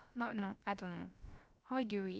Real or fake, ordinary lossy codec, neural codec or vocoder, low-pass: fake; none; codec, 16 kHz, about 1 kbps, DyCAST, with the encoder's durations; none